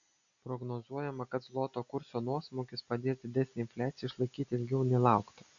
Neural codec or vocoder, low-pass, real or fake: none; 7.2 kHz; real